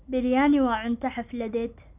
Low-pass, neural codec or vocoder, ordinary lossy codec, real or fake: 3.6 kHz; none; none; real